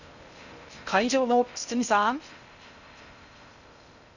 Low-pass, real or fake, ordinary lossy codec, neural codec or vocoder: 7.2 kHz; fake; none; codec, 16 kHz in and 24 kHz out, 0.6 kbps, FocalCodec, streaming, 2048 codes